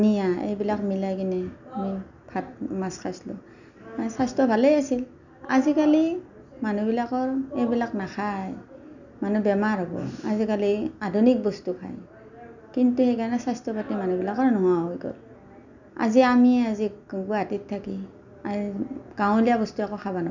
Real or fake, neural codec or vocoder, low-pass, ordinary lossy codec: real; none; 7.2 kHz; none